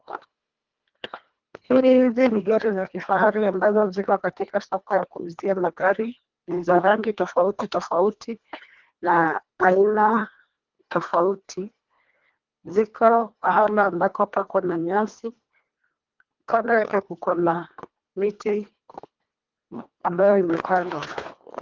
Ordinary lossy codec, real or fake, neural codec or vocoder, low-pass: Opus, 32 kbps; fake; codec, 24 kHz, 1.5 kbps, HILCodec; 7.2 kHz